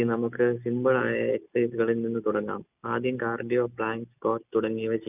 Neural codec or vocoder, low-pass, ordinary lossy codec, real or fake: none; 3.6 kHz; none; real